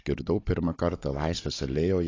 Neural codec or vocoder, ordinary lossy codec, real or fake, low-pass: codec, 16 kHz, 16 kbps, FunCodec, trained on Chinese and English, 50 frames a second; AAC, 32 kbps; fake; 7.2 kHz